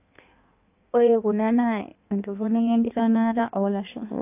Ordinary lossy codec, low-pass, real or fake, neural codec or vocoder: AAC, 32 kbps; 3.6 kHz; fake; codec, 16 kHz in and 24 kHz out, 1.1 kbps, FireRedTTS-2 codec